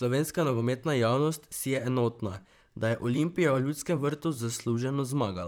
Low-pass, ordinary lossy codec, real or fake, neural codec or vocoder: none; none; fake; vocoder, 44.1 kHz, 128 mel bands, Pupu-Vocoder